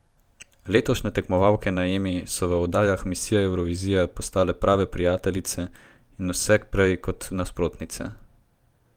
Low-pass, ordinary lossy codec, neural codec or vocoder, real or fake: 19.8 kHz; Opus, 32 kbps; vocoder, 44.1 kHz, 128 mel bands every 512 samples, BigVGAN v2; fake